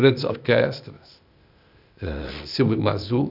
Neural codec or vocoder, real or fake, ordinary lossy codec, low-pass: codec, 16 kHz, 0.8 kbps, ZipCodec; fake; none; 5.4 kHz